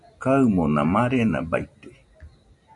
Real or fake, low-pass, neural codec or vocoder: real; 10.8 kHz; none